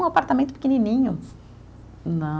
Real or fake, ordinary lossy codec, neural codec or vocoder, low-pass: real; none; none; none